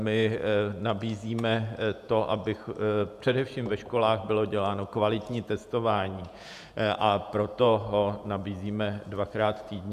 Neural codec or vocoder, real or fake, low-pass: vocoder, 48 kHz, 128 mel bands, Vocos; fake; 14.4 kHz